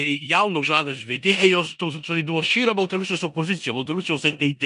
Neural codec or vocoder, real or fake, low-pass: codec, 16 kHz in and 24 kHz out, 0.9 kbps, LongCat-Audio-Codec, four codebook decoder; fake; 10.8 kHz